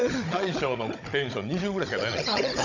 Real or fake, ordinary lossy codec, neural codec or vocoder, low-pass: fake; none; codec, 16 kHz, 16 kbps, FunCodec, trained on Chinese and English, 50 frames a second; 7.2 kHz